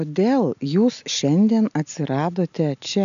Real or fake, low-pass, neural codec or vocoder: real; 7.2 kHz; none